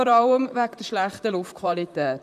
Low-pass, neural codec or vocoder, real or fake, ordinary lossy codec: 14.4 kHz; vocoder, 44.1 kHz, 128 mel bands, Pupu-Vocoder; fake; none